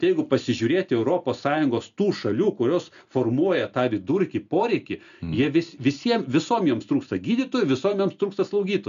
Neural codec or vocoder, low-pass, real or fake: none; 7.2 kHz; real